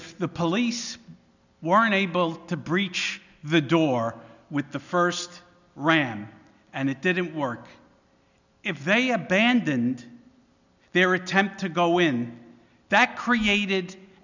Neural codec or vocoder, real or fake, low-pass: none; real; 7.2 kHz